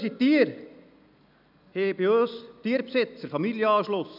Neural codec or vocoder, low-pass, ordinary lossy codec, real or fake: none; 5.4 kHz; none; real